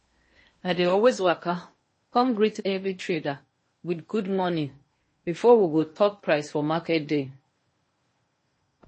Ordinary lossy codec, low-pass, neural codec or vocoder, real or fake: MP3, 32 kbps; 9.9 kHz; codec, 16 kHz in and 24 kHz out, 0.8 kbps, FocalCodec, streaming, 65536 codes; fake